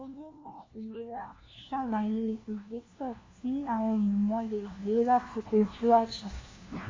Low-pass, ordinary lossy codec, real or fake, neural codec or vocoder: 7.2 kHz; AAC, 32 kbps; fake; codec, 16 kHz, 1 kbps, FunCodec, trained on LibriTTS, 50 frames a second